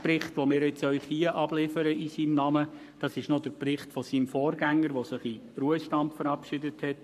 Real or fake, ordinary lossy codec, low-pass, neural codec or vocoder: fake; none; 14.4 kHz; codec, 44.1 kHz, 7.8 kbps, Pupu-Codec